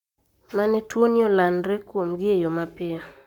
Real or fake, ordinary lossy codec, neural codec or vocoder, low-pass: fake; none; codec, 44.1 kHz, 7.8 kbps, Pupu-Codec; 19.8 kHz